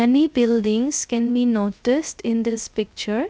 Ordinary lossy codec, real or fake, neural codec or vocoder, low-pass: none; fake; codec, 16 kHz, 0.3 kbps, FocalCodec; none